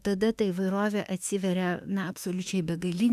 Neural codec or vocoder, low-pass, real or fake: autoencoder, 48 kHz, 32 numbers a frame, DAC-VAE, trained on Japanese speech; 14.4 kHz; fake